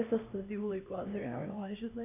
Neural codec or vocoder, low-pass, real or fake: codec, 16 kHz, 1 kbps, X-Codec, HuBERT features, trained on LibriSpeech; 3.6 kHz; fake